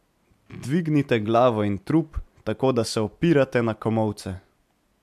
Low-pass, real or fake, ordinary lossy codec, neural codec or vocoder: 14.4 kHz; real; MP3, 96 kbps; none